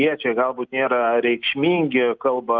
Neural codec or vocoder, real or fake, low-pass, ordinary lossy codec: none; real; 7.2 kHz; Opus, 24 kbps